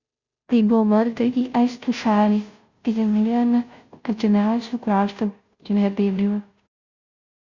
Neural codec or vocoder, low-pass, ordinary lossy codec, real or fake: codec, 16 kHz, 0.5 kbps, FunCodec, trained on Chinese and English, 25 frames a second; 7.2 kHz; Opus, 64 kbps; fake